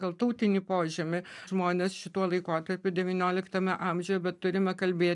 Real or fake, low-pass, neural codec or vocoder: fake; 10.8 kHz; vocoder, 44.1 kHz, 128 mel bands every 512 samples, BigVGAN v2